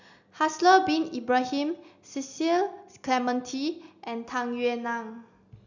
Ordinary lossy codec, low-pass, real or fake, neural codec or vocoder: none; 7.2 kHz; real; none